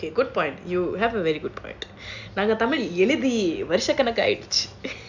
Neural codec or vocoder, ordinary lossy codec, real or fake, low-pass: none; none; real; 7.2 kHz